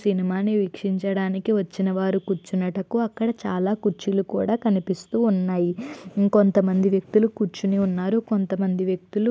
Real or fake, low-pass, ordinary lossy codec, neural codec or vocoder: real; none; none; none